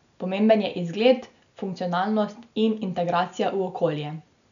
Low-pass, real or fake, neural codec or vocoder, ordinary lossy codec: 7.2 kHz; real; none; none